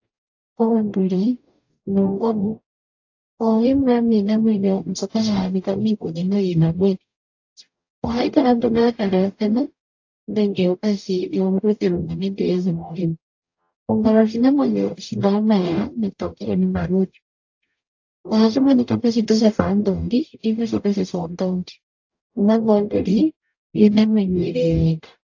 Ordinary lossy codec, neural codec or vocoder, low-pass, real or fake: AAC, 48 kbps; codec, 44.1 kHz, 0.9 kbps, DAC; 7.2 kHz; fake